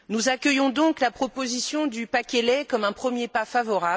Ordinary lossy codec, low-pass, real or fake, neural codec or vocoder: none; none; real; none